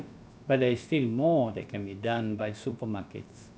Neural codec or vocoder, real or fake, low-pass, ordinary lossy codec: codec, 16 kHz, about 1 kbps, DyCAST, with the encoder's durations; fake; none; none